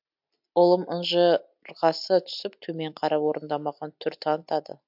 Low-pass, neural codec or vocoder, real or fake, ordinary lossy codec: 5.4 kHz; none; real; MP3, 48 kbps